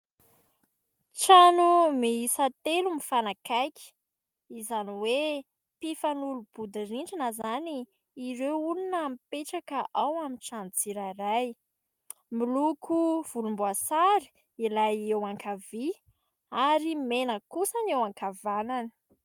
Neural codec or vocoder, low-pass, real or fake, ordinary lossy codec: none; 19.8 kHz; real; Opus, 32 kbps